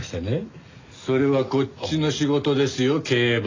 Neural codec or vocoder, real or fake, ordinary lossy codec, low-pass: none; real; AAC, 32 kbps; 7.2 kHz